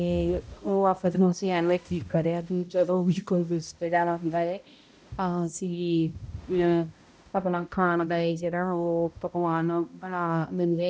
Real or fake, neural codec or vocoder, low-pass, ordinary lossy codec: fake; codec, 16 kHz, 0.5 kbps, X-Codec, HuBERT features, trained on balanced general audio; none; none